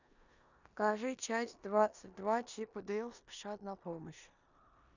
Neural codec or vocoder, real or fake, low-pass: codec, 16 kHz in and 24 kHz out, 0.9 kbps, LongCat-Audio-Codec, four codebook decoder; fake; 7.2 kHz